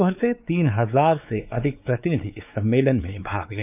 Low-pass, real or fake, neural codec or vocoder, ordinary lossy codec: 3.6 kHz; fake; codec, 16 kHz, 4 kbps, X-Codec, WavLM features, trained on Multilingual LibriSpeech; none